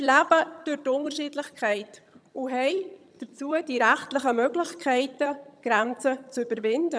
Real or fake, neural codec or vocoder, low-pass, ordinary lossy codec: fake; vocoder, 22.05 kHz, 80 mel bands, HiFi-GAN; none; none